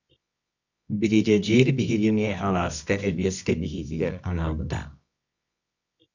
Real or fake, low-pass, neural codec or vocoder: fake; 7.2 kHz; codec, 24 kHz, 0.9 kbps, WavTokenizer, medium music audio release